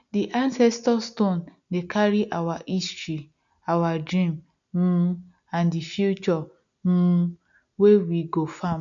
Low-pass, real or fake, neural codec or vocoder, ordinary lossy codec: 7.2 kHz; real; none; none